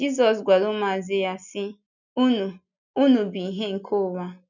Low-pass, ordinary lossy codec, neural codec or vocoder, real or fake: 7.2 kHz; none; none; real